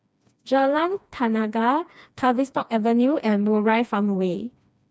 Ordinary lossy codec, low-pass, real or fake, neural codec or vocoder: none; none; fake; codec, 16 kHz, 2 kbps, FreqCodec, smaller model